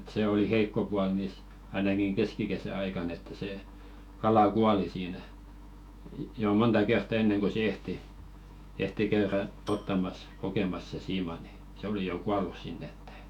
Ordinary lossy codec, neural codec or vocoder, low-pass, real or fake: none; autoencoder, 48 kHz, 128 numbers a frame, DAC-VAE, trained on Japanese speech; 19.8 kHz; fake